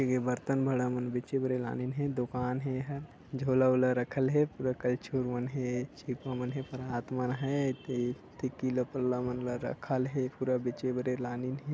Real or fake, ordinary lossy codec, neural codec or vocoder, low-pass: real; none; none; none